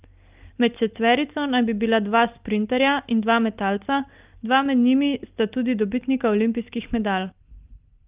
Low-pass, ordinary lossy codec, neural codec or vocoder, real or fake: 3.6 kHz; Opus, 24 kbps; none; real